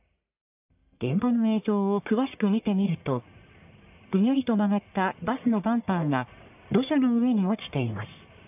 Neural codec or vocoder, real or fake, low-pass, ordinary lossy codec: codec, 44.1 kHz, 1.7 kbps, Pupu-Codec; fake; 3.6 kHz; AAC, 32 kbps